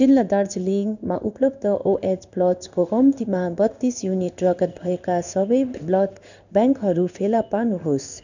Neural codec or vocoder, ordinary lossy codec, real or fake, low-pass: codec, 16 kHz in and 24 kHz out, 1 kbps, XY-Tokenizer; none; fake; 7.2 kHz